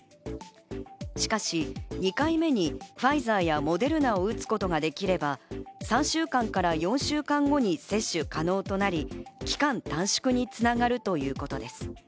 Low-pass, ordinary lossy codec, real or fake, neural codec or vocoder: none; none; real; none